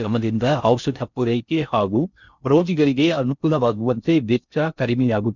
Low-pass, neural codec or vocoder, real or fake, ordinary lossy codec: 7.2 kHz; codec, 16 kHz in and 24 kHz out, 0.6 kbps, FocalCodec, streaming, 4096 codes; fake; none